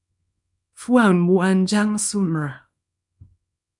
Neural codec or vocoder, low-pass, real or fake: codec, 24 kHz, 0.9 kbps, WavTokenizer, small release; 10.8 kHz; fake